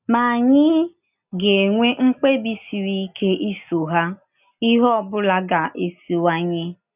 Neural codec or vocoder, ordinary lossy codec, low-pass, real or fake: none; none; 3.6 kHz; real